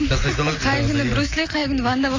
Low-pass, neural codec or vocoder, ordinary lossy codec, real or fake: 7.2 kHz; none; AAC, 32 kbps; real